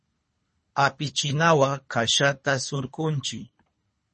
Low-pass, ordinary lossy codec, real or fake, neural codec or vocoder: 10.8 kHz; MP3, 32 kbps; fake; codec, 24 kHz, 3 kbps, HILCodec